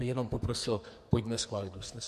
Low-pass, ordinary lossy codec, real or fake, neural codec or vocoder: 14.4 kHz; MP3, 64 kbps; fake; codec, 44.1 kHz, 2.6 kbps, SNAC